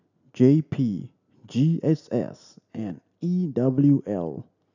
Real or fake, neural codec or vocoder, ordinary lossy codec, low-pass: real; none; none; 7.2 kHz